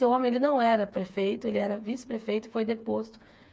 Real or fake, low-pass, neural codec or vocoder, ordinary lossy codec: fake; none; codec, 16 kHz, 4 kbps, FreqCodec, smaller model; none